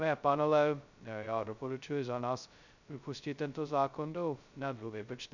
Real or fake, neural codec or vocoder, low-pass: fake; codec, 16 kHz, 0.2 kbps, FocalCodec; 7.2 kHz